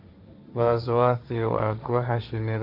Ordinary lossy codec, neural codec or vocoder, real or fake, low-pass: none; codec, 16 kHz, 1.1 kbps, Voila-Tokenizer; fake; 5.4 kHz